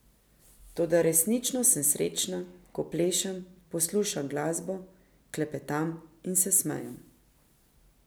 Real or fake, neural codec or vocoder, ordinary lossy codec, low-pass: real; none; none; none